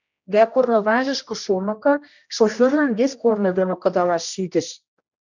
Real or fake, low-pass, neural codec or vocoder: fake; 7.2 kHz; codec, 16 kHz, 1 kbps, X-Codec, HuBERT features, trained on general audio